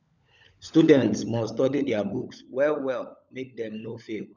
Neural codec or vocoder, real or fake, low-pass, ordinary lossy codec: codec, 16 kHz, 16 kbps, FunCodec, trained on LibriTTS, 50 frames a second; fake; 7.2 kHz; none